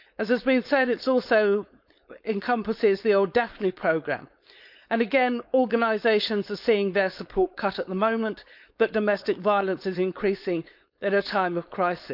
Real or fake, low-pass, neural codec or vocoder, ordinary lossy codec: fake; 5.4 kHz; codec, 16 kHz, 4.8 kbps, FACodec; none